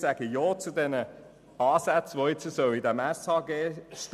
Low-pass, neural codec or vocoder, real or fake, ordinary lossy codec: 14.4 kHz; none; real; none